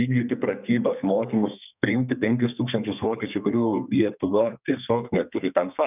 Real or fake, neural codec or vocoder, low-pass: fake; codec, 32 kHz, 1.9 kbps, SNAC; 3.6 kHz